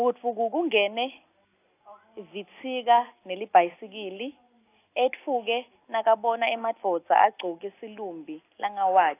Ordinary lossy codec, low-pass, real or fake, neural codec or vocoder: AAC, 24 kbps; 3.6 kHz; real; none